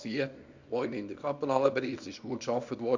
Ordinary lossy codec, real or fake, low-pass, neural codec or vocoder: Opus, 64 kbps; fake; 7.2 kHz; codec, 24 kHz, 0.9 kbps, WavTokenizer, small release